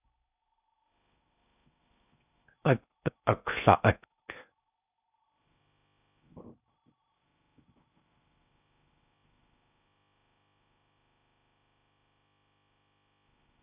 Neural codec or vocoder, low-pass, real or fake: codec, 16 kHz in and 24 kHz out, 0.6 kbps, FocalCodec, streaming, 4096 codes; 3.6 kHz; fake